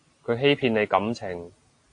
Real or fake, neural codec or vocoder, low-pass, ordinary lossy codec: real; none; 9.9 kHz; AAC, 48 kbps